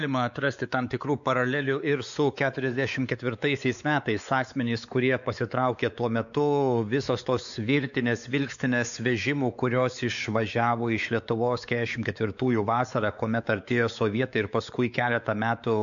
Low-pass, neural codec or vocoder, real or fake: 7.2 kHz; codec, 16 kHz, 4 kbps, X-Codec, WavLM features, trained on Multilingual LibriSpeech; fake